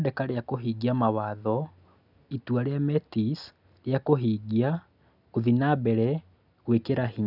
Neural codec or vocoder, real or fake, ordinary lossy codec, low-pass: none; real; none; 5.4 kHz